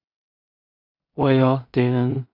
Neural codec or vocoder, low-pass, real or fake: codec, 16 kHz in and 24 kHz out, 0.4 kbps, LongCat-Audio-Codec, two codebook decoder; 5.4 kHz; fake